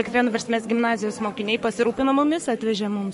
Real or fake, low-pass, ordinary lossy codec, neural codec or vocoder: fake; 14.4 kHz; MP3, 48 kbps; codec, 44.1 kHz, 7.8 kbps, Pupu-Codec